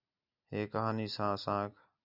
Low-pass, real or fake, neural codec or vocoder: 5.4 kHz; real; none